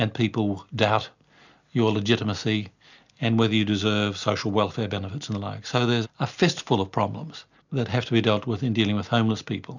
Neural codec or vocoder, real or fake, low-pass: none; real; 7.2 kHz